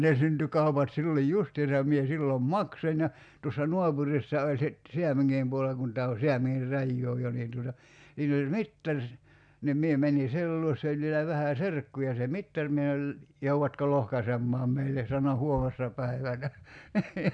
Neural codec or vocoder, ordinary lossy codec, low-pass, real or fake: none; none; 9.9 kHz; real